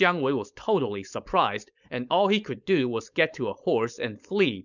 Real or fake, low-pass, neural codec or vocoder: fake; 7.2 kHz; codec, 16 kHz, 4.8 kbps, FACodec